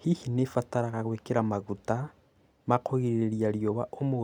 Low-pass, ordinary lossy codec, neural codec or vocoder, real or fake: 19.8 kHz; none; none; real